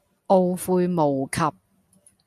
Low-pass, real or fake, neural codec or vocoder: 14.4 kHz; real; none